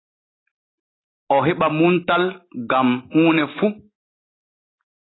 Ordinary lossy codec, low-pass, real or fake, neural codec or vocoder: AAC, 16 kbps; 7.2 kHz; real; none